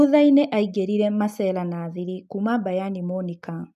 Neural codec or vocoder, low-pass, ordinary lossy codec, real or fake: none; 14.4 kHz; none; real